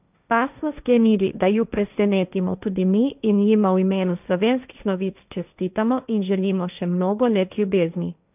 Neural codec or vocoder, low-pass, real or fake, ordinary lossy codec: codec, 16 kHz, 1.1 kbps, Voila-Tokenizer; 3.6 kHz; fake; none